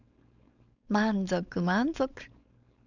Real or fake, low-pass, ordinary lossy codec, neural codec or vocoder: fake; 7.2 kHz; none; codec, 16 kHz, 4.8 kbps, FACodec